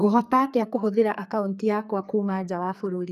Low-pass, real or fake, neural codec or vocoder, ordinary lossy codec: 14.4 kHz; fake; codec, 44.1 kHz, 2.6 kbps, SNAC; none